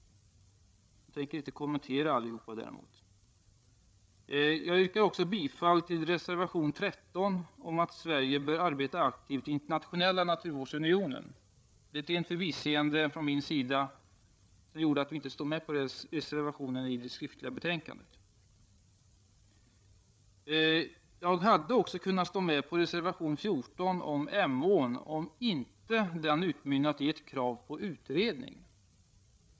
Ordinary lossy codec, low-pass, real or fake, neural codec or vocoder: none; none; fake; codec, 16 kHz, 8 kbps, FreqCodec, larger model